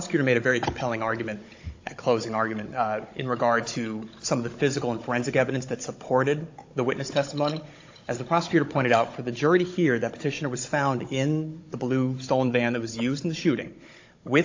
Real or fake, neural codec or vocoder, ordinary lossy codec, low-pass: fake; codec, 16 kHz, 16 kbps, FunCodec, trained on Chinese and English, 50 frames a second; AAC, 48 kbps; 7.2 kHz